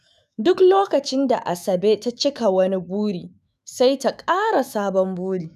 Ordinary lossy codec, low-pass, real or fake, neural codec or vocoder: none; 14.4 kHz; fake; autoencoder, 48 kHz, 128 numbers a frame, DAC-VAE, trained on Japanese speech